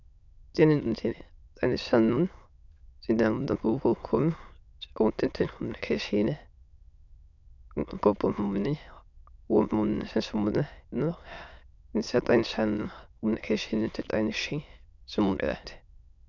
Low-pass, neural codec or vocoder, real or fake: 7.2 kHz; autoencoder, 22.05 kHz, a latent of 192 numbers a frame, VITS, trained on many speakers; fake